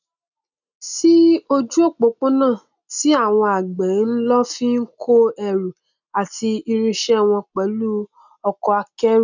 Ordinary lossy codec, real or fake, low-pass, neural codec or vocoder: none; real; 7.2 kHz; none